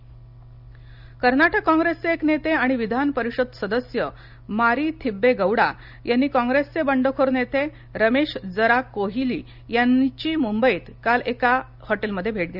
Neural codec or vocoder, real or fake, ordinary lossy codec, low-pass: none; real; none; 5.4 kHz